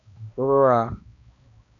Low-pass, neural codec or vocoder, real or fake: 7.2 kHz; codec, 16 kHz, 1 kbps, X-Codec, HuBERT features, trained on balanced general audio; fake